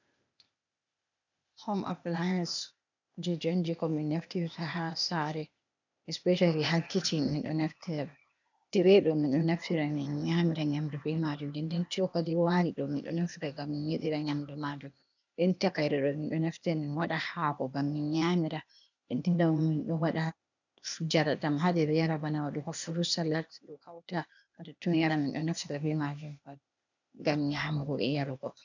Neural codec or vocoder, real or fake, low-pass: codec, 16 kHz, 0.8 kbps, ZipCodec; fake; 7.2 kHz